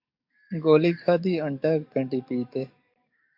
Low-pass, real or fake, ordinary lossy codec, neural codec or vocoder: 5.4 kHz; fake; MP3, 48 kbps; codec, 44.1 kHz, 7.8 kbps, DAC